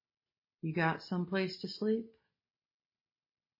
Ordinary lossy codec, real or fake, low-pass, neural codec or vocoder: MP3, 24 kbps; real; 5.4 kHz; none